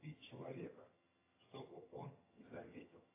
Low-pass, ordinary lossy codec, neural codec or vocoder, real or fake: 3.6 kHz; AAC, 16 kbps; vocoder, 22.05 kHz, 80 mel bands, HiFi-GAN; fake